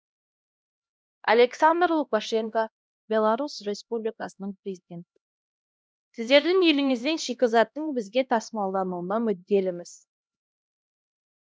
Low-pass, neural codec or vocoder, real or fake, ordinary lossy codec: none; codec, 16 kHz, 1 kbps, X-Codec, HuBERT features, trained on LibriSpeech; fake; none